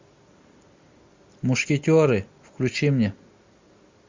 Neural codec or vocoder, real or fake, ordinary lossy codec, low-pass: none; real; MP3, 64 kbps; 7.2 kHz